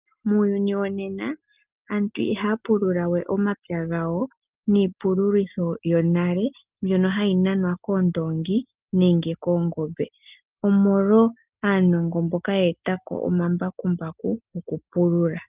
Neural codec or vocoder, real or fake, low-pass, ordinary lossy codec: none; real; 3.6 kHz; Opus, 32 kbps